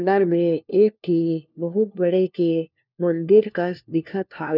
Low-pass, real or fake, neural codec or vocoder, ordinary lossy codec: 5.4 kHz; fake; codec, 16 kHz, 1 kbps, FunCodec, trained on LibriTTS, 50 frames a second; none